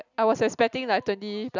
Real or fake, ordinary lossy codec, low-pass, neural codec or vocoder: real; none; 7.2 kHz; none